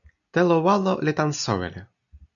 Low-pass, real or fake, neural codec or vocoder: 7.2 kHz; real; none